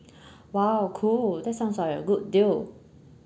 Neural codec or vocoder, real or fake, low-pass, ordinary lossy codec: none; real; none; none